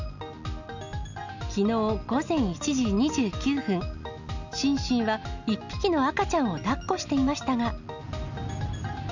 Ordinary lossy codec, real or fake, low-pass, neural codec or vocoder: none; real; 7.2 kHz; none